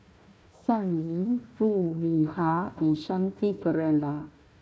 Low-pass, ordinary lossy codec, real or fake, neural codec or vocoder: none; none; fake; codec, 16 kHz, 1 kbps, FunCodec, trained on Chinese and English, 50 frames a second